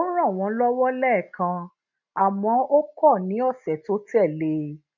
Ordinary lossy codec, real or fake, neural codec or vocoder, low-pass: none; real; none; 7.2 kHz